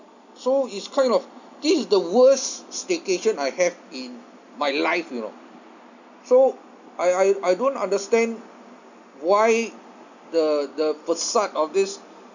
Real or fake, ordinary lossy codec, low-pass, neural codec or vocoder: real; none; 7.2 kHz; none